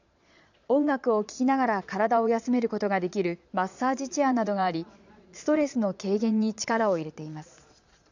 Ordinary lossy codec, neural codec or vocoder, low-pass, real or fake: none; vocoder, 22.05 kHz, 80 mel bands, Vocos; 7.2 kHz; fake